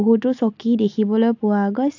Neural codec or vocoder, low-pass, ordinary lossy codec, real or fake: none; 7.2 kHz; AAC, 48 kbps; real